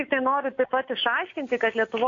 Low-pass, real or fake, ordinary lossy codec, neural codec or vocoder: 7.2 kHz; real; AAC, 48 kbps; none